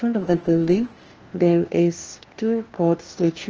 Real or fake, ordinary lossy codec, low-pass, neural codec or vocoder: fake; Opus, 16 kbps; 7.2 kHz; codec, 16 kHz, 1 kbps, FunCodec, trained on LibriTTS, 50 frames a second